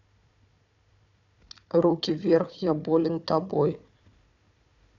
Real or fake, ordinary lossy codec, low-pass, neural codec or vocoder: fake; none; 7.2 kHz; codec, 16 kHz, 4 kbps, FunCodec, trained on Chinese and English, 50 frames a second